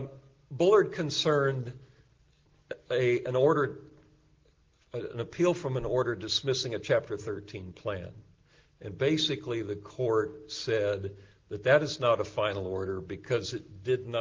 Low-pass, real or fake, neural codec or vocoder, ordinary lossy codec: 7.2 kHz; real; none; Opus, 16 kbps